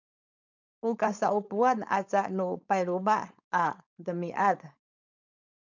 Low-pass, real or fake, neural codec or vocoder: 7.2 kHz; fake; codec, 16 kHz, 4.8 kbps, FACodec